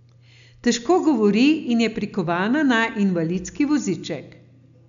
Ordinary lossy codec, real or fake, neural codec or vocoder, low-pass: none; real; none; 7.2 kHz